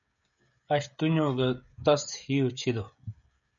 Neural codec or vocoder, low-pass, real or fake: codec, 16 kHz, 16 kbps, FreqCodec, smaller model; 7.2 kHz; fake